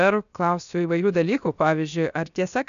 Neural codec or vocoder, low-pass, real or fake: codec, 16 kHz, 0.7 kbps, FocalCodec; 7.2 kHz; fake